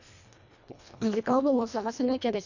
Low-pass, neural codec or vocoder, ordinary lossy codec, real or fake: 7.2 kHz; codec, 24 kHz, 1.5 kbps, HILCodec; none; fake